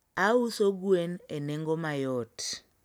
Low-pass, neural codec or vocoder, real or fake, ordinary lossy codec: none; none; real; none